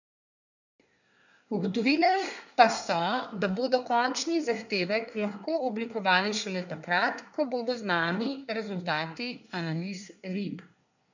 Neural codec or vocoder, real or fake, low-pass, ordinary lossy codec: codec, 24 kHz, 1 kbps, SNAC; fake; 7.2 kHz; none